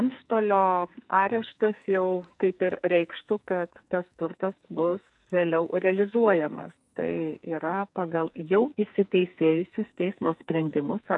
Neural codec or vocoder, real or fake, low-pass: codec, 44.1 kHz, 2.6 kbps, SNAC; fake; 10.8 kHz